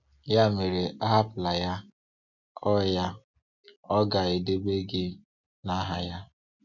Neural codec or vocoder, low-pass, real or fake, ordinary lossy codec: none; 7.2 kHz; real; none